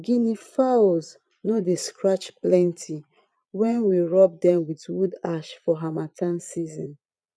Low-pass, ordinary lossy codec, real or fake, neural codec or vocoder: none; none; fake; vocoder, 22.05 kHz, 80 mel bands, Vocos